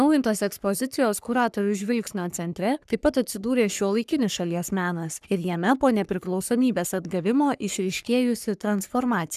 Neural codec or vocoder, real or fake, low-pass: codec, 44.1 kHz, 3.4 kbps, Pupu-Codec; fake; 14.4 kHz